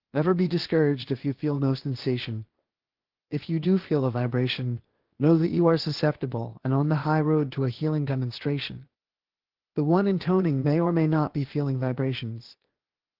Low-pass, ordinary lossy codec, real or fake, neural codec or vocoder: 5.4 kHz; Opus, 16 kbps; fake; codec, 16 kHz, 0.8 kbps, ZipCodec